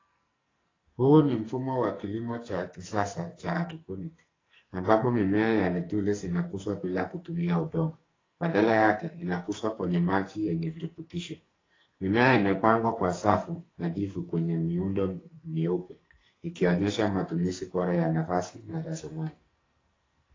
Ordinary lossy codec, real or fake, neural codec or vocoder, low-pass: AAC, 32 kbps; fake; codec, 44.1 kHz, 2.6 kbps, SNAC; 7.2 kHz